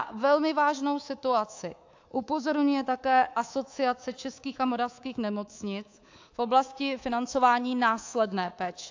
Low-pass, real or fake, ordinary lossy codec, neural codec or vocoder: 7.2 kHz; fake; AAC, 48 kbps; codec, 24 kHz, 3.1 kbps, DualCodec